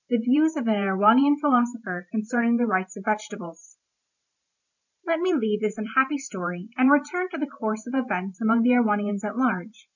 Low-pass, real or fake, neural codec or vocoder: 7.2 kHz; fake; vocoder, 44.1 kHz, 128 mel bands every 512 samples, BigVGAN v2